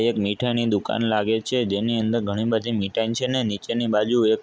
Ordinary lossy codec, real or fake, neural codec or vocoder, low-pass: none; real; none; none